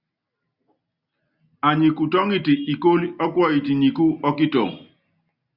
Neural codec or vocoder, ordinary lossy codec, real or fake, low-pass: none; Opus, 64 kbps; real; 5.4 kHz